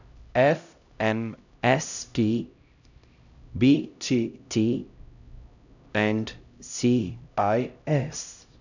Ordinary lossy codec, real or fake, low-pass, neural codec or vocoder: none; fake; 7.2 kHz; codec, 16 kHz, 0.5 kbps, X-Codec, HuBERT features, trained on LibriSpeech